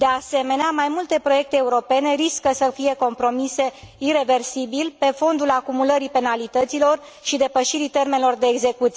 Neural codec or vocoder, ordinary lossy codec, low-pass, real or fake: none; none; none; real